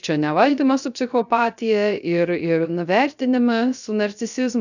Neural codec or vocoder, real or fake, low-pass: codec, 16 kHz, 0.3 kbps, FocalCodec; fake; 7.2 kHz